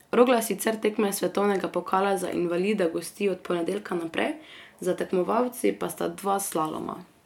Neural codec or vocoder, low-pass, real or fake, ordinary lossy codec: none; 19.8 kHz; real; MP3, 96 kbps